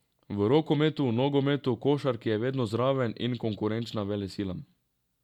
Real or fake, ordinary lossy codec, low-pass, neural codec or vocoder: real; none; 19.8 kHz; none